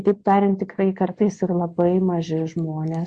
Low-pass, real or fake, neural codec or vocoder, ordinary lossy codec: 9.9 kHz; real; none; Opus, 64 kbps